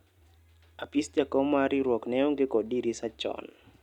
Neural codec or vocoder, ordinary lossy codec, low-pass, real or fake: none; none; 19.8 kHz; real